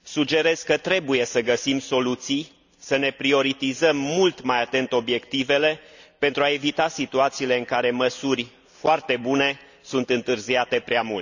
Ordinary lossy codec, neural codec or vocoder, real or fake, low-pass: none; none; real; 7.2 kHz